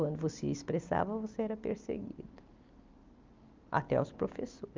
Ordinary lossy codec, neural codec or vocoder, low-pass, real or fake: Opus, 32 kbps; none; 7.2 kHz; real